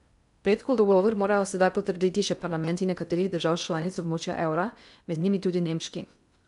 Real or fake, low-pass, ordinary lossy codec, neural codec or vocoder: fake; 10.8 kHz; none; codec, 16 kHz in and 24 kHz out, 0.6 kbps, FocalCodec, streaming, 2048 codes